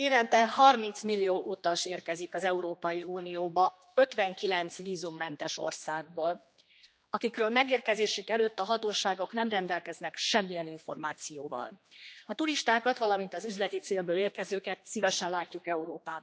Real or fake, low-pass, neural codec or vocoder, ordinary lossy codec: fake; none; codec, 16 kHz, 2 kbps, X-Codec, HuBERT features, trained on general audio; none